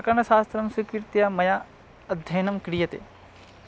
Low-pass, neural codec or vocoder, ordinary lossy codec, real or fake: none; none; none; real